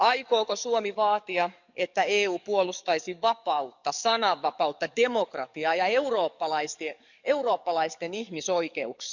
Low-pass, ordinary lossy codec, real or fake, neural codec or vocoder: 7.2 kHz; none; fake; codec, 44.1 kHz, 7.8 kbps, DAC